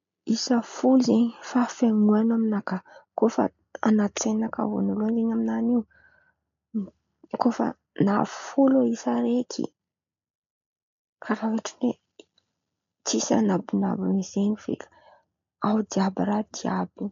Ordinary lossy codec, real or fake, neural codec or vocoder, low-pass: MP3, 64 kbps; real; none; 7.2 kHz